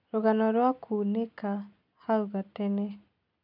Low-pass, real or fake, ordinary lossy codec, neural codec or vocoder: 5.4 kHz; real; none; none